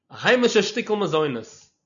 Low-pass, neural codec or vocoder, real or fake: 7.2 kHz; none; real